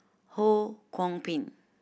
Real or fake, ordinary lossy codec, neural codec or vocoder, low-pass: real; none; none; none